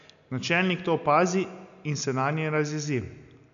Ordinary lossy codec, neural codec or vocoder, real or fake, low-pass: none; none; real; 7.2 kHz